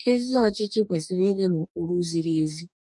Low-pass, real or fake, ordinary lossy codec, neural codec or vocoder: 10.8 kHz; fake; none; codec, 44.1 kHz, 2.6 kbps, DAC